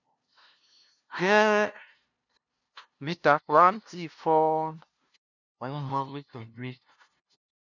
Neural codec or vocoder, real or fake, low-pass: codec, 16 kHz, 0.5 kbps, FunCodec, trained on LibriTTS, 25 frames a second; fake; 7.2 kHz